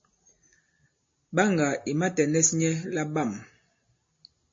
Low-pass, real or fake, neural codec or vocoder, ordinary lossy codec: 7.2 kHz; real; none; MP3, 32 kbps